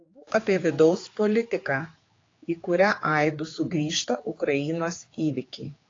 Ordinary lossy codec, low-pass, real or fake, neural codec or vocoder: AAC, 32 kbps; 7.2 kHz; fake; codec, 16 kHz, 4 kbps, X-Codec, HuBERT features, trained on general audio